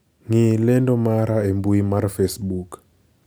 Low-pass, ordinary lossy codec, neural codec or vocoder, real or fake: none; none; none; real